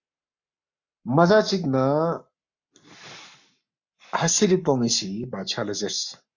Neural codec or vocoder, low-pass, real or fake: codec, 44.1 kHz, 7.8 kbps, Pupu-Codec; 7.2 kHz; fake